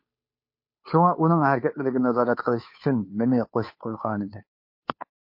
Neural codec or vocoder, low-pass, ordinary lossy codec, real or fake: codec, 16 kHz, 2 kbps, FunCodec, trained on Chinese and English, 25 frames a second; 5.4 kHz; MP3, 32 kbps; fake